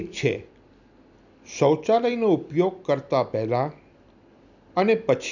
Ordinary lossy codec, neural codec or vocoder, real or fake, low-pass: none; none; real; 7.2 kHz